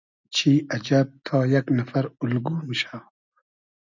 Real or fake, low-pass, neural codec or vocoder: real; 7.2 kHz; none